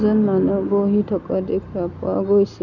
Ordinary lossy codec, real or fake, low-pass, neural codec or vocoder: none; real; 7.2 kHz; none